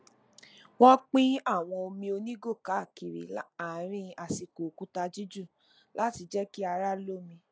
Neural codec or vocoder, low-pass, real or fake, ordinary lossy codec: none; none; real; none